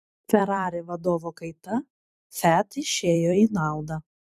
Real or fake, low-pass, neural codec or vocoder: fake; 14.4 kHz; vocoder, 44.1 kHz, 128 mel bands every 256 samples, BigVGAN v2